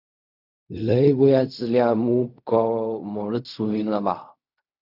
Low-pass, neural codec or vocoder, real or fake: 5.4 kHz; codec, 16 kHz in and 24 kHz out, 0.4 kbps, LongCat-Audio-Codec, fine tuned four codebook decoder; fake